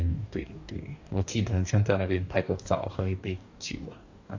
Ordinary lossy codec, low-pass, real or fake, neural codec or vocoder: AAC, 48 kbps; 7.2 kHz; fake; codec, 44.1 kHz, 2.6 kbps, DAC